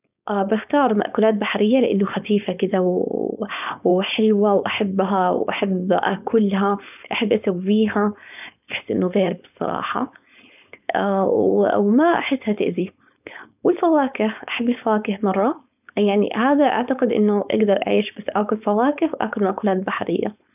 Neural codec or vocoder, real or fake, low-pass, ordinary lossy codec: codec, 16 kHz, 4.8 kbps, FACodec; fake; 3.6 kHz; none